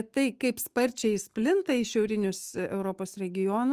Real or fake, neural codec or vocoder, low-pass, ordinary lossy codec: fake; autoencoder, 48 kHz, 128 numbers a frame, DAC-VAE, trained on Japanese speech; 14.4 kHz; Opus, 24 kbps